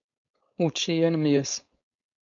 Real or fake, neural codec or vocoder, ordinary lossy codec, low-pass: fake; codec, 16 kHz, 4.8 kbps, FACodec; MP3, 64 kbps; 7.2 kHz